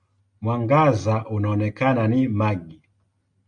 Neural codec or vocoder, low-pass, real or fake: none; 9.9 kHz; real